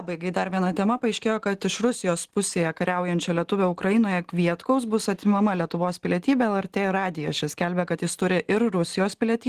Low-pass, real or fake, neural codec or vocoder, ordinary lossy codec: 14.4 kHz; real; none; Opus, 16 kbps